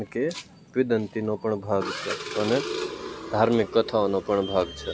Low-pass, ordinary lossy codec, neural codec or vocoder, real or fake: none; none; none; real